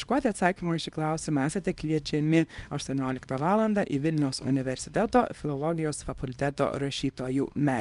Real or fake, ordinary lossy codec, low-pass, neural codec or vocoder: fake; MP3, 96 kbps; 10.8 kHz; codec, 24 kHz, 0.9 kbps, WavTokenizer, small release